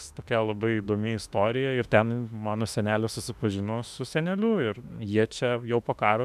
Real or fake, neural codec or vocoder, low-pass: fake; autoencoder, 48 kHz, 32 numbers a frame, DAC-VAE, trained on Japanese speech; 14.4 kHz